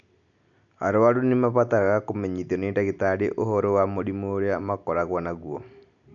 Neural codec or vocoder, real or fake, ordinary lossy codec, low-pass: none; real; none; 7.2 kHz